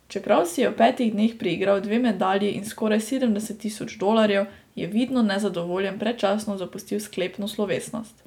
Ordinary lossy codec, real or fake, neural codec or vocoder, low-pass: none; real; none; 19.8 kHz